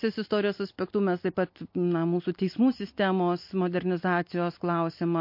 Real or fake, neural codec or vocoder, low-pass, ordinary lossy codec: real; none; 5.4 kHz; MP3, 32 kbps